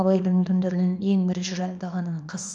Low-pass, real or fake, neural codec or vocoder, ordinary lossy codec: 9.9 kHz; fake; codec, 24 kHz, 0.9 kbps, WavTokenizer, small release; none